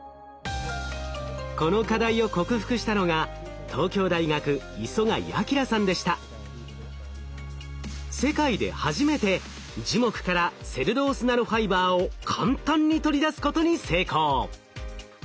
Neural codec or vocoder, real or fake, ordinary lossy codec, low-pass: none; real; none; none